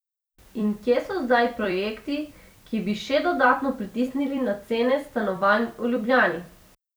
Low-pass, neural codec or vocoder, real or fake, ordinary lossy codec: none; vocoder, 44.1 kHz, 128 mel bands every 256 samples, BigVGAN v2; fake; none